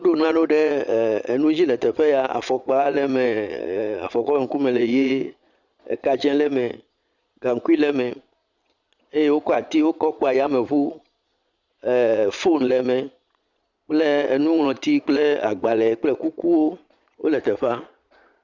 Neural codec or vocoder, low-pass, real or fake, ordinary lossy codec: vocoder, 22.05 kHz, 80 mel bands, Vocos; 7.2 kHz; fake; Opus, 64 kbps